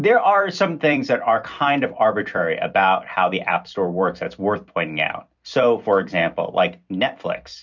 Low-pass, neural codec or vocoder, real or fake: 7.2 kHz; vocoder, 44.1 kHz, 128 mel bands every 256 samples, BigVGAN v2; fake